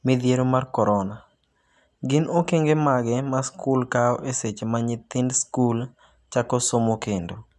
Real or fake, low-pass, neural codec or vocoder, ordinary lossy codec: real; none; none; none